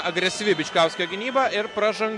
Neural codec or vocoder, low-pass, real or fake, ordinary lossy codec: none; 10.8 kHz; real; MP3, 96 kbps